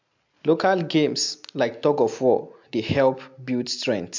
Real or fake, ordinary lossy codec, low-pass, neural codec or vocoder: real; MP3, 64 kbps; 7.2 kHz; none